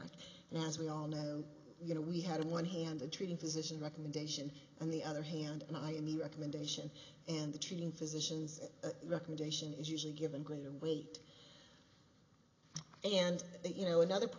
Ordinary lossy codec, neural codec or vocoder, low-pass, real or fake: AAC, 32 kbps; none; 7.2 kHz; real